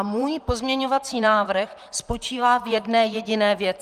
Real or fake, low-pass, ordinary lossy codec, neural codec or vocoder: fake; 14.4 kHz; Opus, 32 kbps; vocoder, 44.1 kHz, 128 mel bands, Pupu-Vocoder